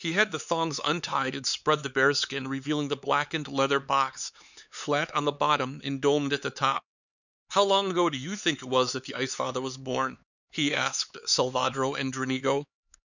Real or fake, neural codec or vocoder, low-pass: fake; codec, 16 kHz, 4 kbps, X-Codec, HuBERT features, trained on LibriSpeech; 7.2 kHz